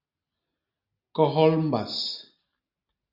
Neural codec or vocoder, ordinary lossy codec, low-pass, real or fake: none; Opus, 64 kbps; 5.4 kHz; real